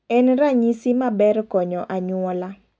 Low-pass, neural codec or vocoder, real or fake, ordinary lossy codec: none; none; real; none